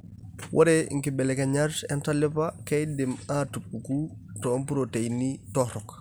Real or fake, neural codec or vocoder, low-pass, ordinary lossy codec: real; none; none; none